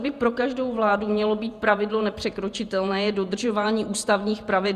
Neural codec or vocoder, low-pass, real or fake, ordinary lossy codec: vocoder, 48 kHz, 128 mel bands, Vocos; 14.4 kHz; fake; Opus, 64 kbps